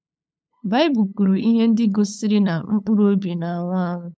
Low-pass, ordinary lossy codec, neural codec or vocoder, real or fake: none; none; codec, 16 kHz, 8 kbps, FunCodec, trained on LibriTTS, 25 frames a second; fake